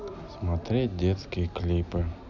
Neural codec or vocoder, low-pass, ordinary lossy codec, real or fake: none; 7.2 kHz; none; real